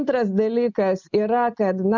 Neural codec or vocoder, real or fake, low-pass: none; real; 7.2 kHz